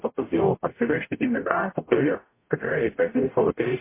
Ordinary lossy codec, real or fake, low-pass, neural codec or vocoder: MP3, 24 kbps; fake; 3.6 kHz; codec, 44.1 kHz, 0.9 kbps, DAC